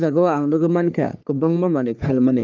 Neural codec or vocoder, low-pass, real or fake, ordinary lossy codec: codec, 44.1 kHz, 3.4 kbps, Pupu-Codec; 7.2 kHz; fake; Opus, 24 kbps